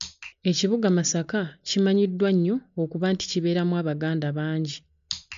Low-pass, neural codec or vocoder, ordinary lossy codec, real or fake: 7.2 kHz; none; MP3, 64 kbps; real